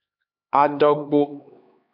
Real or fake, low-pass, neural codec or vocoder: fake; 5.4 kHz; codec, 16 kHz, 4 kbps, X-Codec, HuBERT features, trained on LibriSpeech